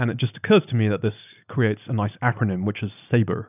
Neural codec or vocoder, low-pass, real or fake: none; 3.6 kHz; real